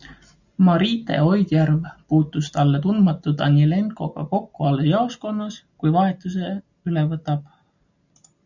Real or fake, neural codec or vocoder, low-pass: real; none; 7.2 kHz